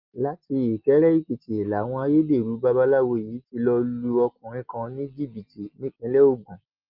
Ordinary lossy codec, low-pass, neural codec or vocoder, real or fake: Opus, 32 kbps; 5.4 kHz; none; real